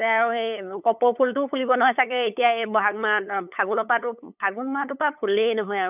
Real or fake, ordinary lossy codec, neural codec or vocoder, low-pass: fake; none; codec, 16 kHz, 8 kbps, FunCodec, trained on LibriTTS, 25 frames a second; 3.6 kHz